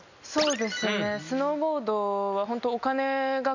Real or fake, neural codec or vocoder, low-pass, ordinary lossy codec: real; none; 7.2 kHz; none